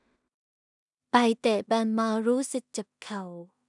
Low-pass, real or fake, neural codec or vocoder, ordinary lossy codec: 10.8 kHz; fake; codec, 16 kHz in and 24 kHz out, 0.4 kbps, LongCat-Audio-Codec, two codebook decoder; none